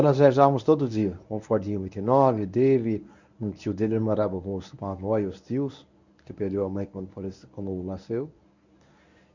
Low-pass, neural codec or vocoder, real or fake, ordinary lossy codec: 7.2 kHz; codec, 24 kHz, 0.9 kbps, WavTokenizer, medium speech release version 1; fake; none